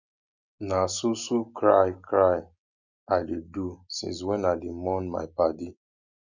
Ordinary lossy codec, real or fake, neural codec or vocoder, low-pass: none; real; none; 7.2 kHz